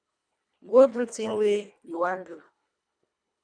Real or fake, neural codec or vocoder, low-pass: fake; codec, 24 kHz, 1.5 kbps, HILCodec; 9.9 kHz